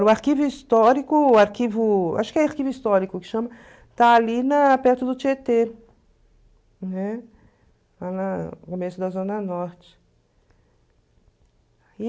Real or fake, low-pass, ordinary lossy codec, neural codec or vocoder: real; none; none; none